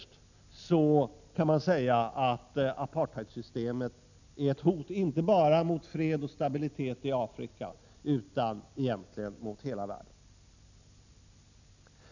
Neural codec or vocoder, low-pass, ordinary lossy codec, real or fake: none; 7.2 kHz; none; real